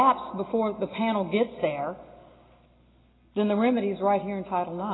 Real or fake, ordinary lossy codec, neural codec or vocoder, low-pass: real; AAC, 16 kbps; none; 7.2 kHz